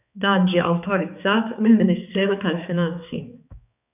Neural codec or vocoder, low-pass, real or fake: codec, 16 kHz, 4 kbps, X-Codec, HuBERT features, trained on balanced general audio; 3.6 kHz; fake